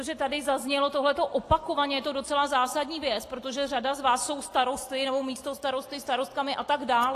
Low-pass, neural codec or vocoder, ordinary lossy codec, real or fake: 14.4 kHz; none; AAC, 48 kbps; real